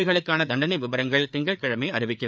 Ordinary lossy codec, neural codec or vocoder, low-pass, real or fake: none; codec, 16 kHz, 4 kbps, FreqCodec, larger model; none; fake